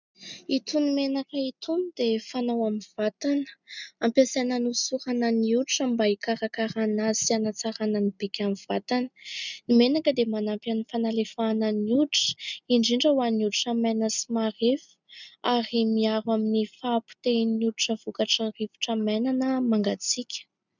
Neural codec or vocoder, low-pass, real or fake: none; 7.2 kHz; real